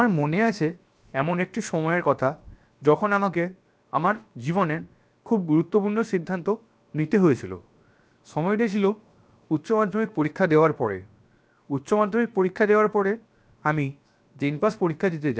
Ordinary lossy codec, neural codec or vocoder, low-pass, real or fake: none; codec, 16 kHz, 0.7 kbps, FocalCodec; none; fake